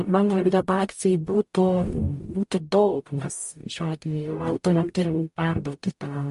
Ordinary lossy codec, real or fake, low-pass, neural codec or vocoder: MP3, 48 kbps; fake; 14.4 kHz; codec, 44.1 kHz, 0.9 kbps, DAC